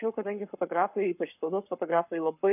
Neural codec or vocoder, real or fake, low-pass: codec, 44.1 kHz, 7.8 kbps, Pupu-Codec; fake; 3.6 kHz